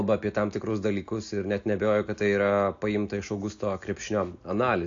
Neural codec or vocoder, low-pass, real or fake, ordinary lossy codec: none; 7.2 kHz; real; AAC, 48 kbps